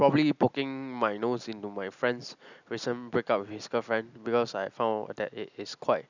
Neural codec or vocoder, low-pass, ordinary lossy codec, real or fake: none; 7.2 kHz; none; real